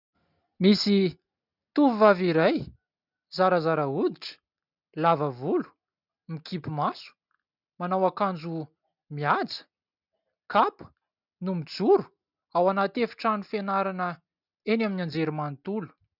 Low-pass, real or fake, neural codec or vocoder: 5.4 kHz; real; none